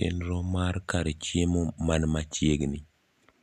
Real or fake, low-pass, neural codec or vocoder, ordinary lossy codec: real; 14.4 kHz; none; none